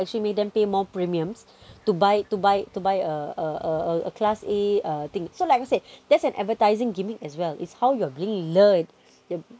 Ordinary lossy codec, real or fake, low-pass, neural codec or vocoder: none; real; none; none